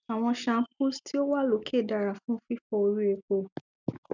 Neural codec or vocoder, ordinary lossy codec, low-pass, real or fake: none; none; 7.2 kHz; real